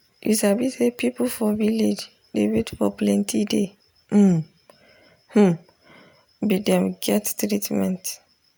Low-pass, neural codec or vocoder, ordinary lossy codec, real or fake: none; none; none; real